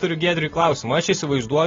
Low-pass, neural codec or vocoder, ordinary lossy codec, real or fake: 7.2 kHz; none; AAC, 24 kbps; real